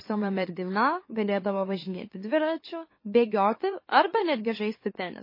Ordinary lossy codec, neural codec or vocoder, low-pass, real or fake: MP3, 24 kbps; autoencoder, 44.1 kHz, a latent of 192 numbers a frame, MeloTTS; 5.4 kHz; fake